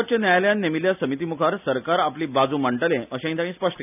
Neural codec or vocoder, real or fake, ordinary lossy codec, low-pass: none; real; none; 3.6 kHz